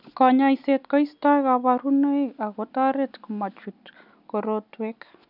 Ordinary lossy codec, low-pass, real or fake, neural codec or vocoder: none; 5.4 kHz; real; none